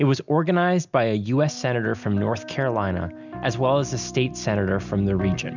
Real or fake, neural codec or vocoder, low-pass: real; none; 7.2 kHz